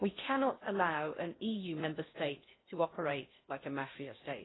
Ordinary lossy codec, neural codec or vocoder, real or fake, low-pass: AAC, 16 kbps; codec, 16 kHz in and 24 kHz out, 0.6 kbps, FocalCodec, streaming, 4096 codes; fake; 7.2 kHz